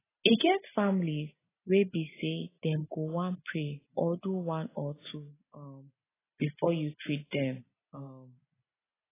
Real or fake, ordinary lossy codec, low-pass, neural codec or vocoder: real; AAC, 16 kbps; 3.6 kHz; none